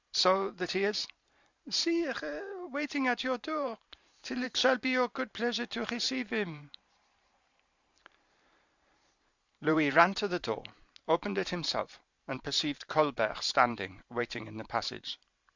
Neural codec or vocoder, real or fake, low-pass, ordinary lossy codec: vocoder, 44.1 kHz, 128 mel bands every 512 samples, BigVGAN v2; fake; 7.2 kHz; Opus, 64 kbps